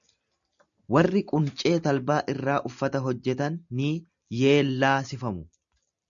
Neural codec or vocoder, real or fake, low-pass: none; real; 7.2 kHz